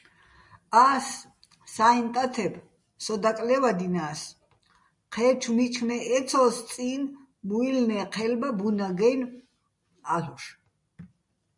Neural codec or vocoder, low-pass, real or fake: none; 10.8 kHz; real